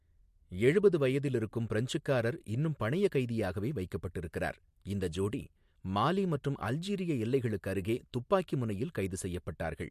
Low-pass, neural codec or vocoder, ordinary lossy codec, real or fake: 14.4 kHz; none; MP3, 64 kbps; real